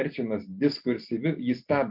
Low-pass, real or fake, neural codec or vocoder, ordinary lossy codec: 5.4 kHz; real; none; MP3, 48 kbps